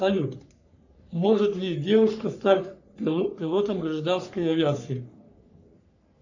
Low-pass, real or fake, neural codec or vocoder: 7.2 kHz; fake; codec, 44.1 kHz, 3.4 kbps, Pupu-Codec